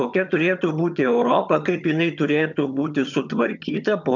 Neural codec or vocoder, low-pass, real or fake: vocoder, 22.05 kHz, 80 mel bands, HiFi-GAN; 7.2 kHz; fake